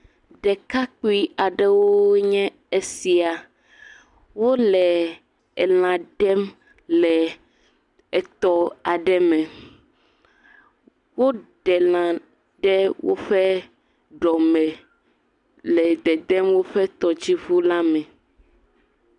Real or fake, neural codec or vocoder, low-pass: real; none; 10.8 kHz